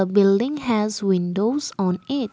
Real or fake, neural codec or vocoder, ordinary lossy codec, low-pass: real; none; none; none